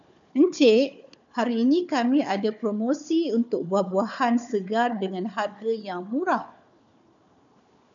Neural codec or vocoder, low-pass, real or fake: codec, 16 kHz, 4 kbps, FunCodec, trained on Chinese and English, 50 frames a second; 7.2 kHz; fake